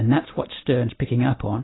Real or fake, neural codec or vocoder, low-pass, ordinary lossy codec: real; none; 7.2 kHz; AAC, 16 kbps